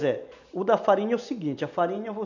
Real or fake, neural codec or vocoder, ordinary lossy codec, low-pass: real; none; none; 7.2 kHz